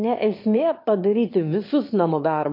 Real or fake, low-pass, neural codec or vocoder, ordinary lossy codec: fake; 5.4 kHz; autoencoder, 22.05 kHz, a latent of 192 numbers a frame, VITS, trained on one speaker; MP3, 32 kbps